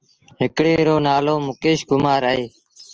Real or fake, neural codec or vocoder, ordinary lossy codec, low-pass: real; none; Opus, 24 kbps; 7.2 kHz